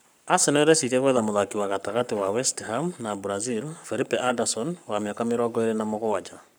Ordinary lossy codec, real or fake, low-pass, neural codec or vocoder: none; fake; none; codec, 44.1 kHz, 7.8 kbps, Pupu-Codec